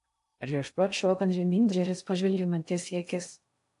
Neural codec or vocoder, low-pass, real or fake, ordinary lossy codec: codec, 16 kHz in and 24 kHz out, 0.6 kbps, FocalCodec, streaming, 2048 codes; 10.8 kHz; fake; AAC, 64 kbps